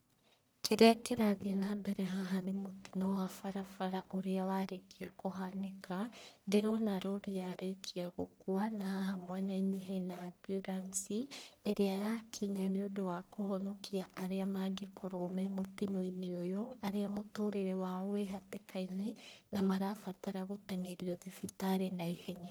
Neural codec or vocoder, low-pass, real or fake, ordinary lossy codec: codec, 44.1 kHz, 1.7 kbps, Pupu-Codec; none; fake; none